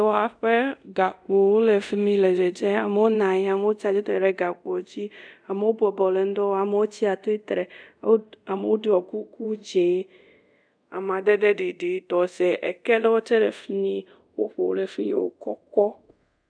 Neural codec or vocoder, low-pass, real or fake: codec, 24 kHz, 0.5 kbps, DualCodec; 9.9 kHz; fake